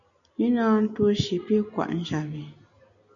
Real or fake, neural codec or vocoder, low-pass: real; none; 7.2 kHz